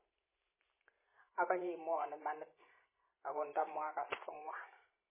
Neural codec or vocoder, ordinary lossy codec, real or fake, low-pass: vocoder, 44.1 kHz, 128 mel bands every 512 samples, BigVGAN v2; MP3, 16 kbps; fake; 3.6 kHz